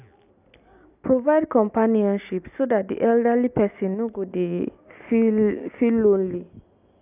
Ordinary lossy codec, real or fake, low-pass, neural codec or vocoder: none; real; 3.6 kHz; none